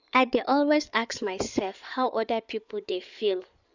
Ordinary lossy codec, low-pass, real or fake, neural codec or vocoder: none; 7.2 kHz; fake; codec, 16 kHz in and 24 kHz out, 2.2 kbps, FireRedTTS-2 codec